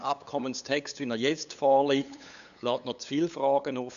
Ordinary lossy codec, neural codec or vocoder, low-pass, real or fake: none; codec, 16 kHz, 8 kbps, FunCodec, trained on LibriTTS, 25 frames a second; 7.2 kHz; fake